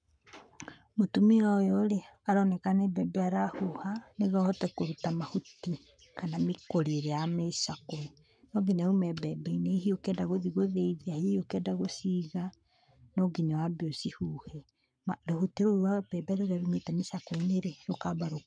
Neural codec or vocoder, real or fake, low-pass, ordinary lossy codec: codec, 44.1 kHz, 7.8 kbps, Pupu-Codec; fake; 9.9 kHz; none